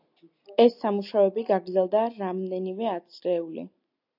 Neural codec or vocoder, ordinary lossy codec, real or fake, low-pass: none; AAC, 48 kbps; real; 5.4 kHz